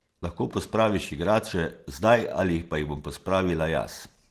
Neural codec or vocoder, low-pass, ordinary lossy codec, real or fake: none; 14.4 kHz; Opus, 16 kbps; real